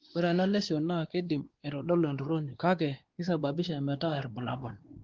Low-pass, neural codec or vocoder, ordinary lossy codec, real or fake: 7.2 kHz; codec, 16 kHz, 2 kbps, X-Codec, WavLM features, trained on Multilingual LibriSpeech; Opus, 16 kbps; fake